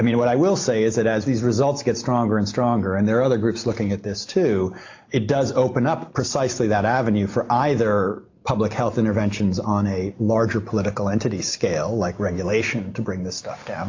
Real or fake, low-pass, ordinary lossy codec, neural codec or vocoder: real; 7.2 kHz; AAC, 48 kbps; none